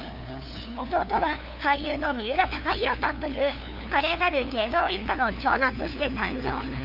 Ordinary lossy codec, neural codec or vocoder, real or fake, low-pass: none; codec, 16 kHz, 2 kbps, FunCodec, trained on LibriTTS, 25 frames a second; fake; 5.4 kHz